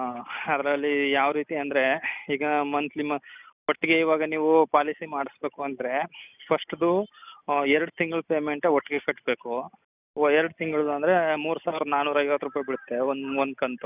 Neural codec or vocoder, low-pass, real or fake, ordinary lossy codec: none; 3.6 kHz; real; none